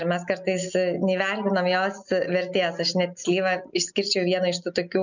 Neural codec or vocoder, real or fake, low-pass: none; real; 7.2 kHz